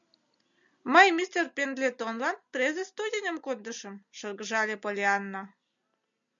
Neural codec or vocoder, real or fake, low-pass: none; real; 7.2 kHz